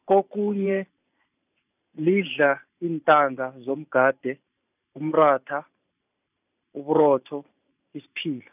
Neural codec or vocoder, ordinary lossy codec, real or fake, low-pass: vocoder, 44.1 kHz, 128 mel bands every 512 samples, BigVGAN v2; none; fake; 3.6 kHz